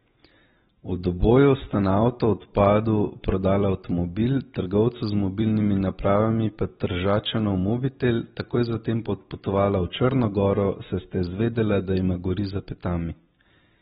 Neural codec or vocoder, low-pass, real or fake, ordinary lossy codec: none; 19.8 kHz; real; AAC, 16 kbps